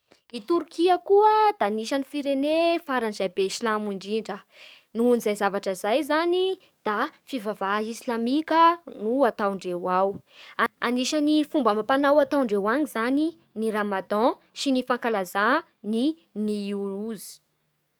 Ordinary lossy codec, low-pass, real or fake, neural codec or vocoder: none; none; fake; codec, 44.1 kHz, 7.8 kbps, DAC